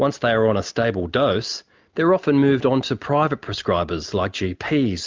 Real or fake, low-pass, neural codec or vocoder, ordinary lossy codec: real; 7.2 kHz; none; Opus, 32 kbps